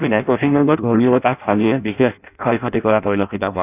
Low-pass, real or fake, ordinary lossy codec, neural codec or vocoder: 3.6 kHz; fake; none; codec, 16 kHz in and 24 kHz out, 0.6 kbps, FireRedTTS-2 codec